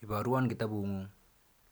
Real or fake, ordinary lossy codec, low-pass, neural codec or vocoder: real; none; none; none